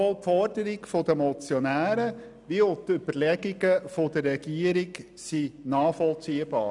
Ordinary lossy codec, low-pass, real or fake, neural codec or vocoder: none; 10.8 kHz; real; none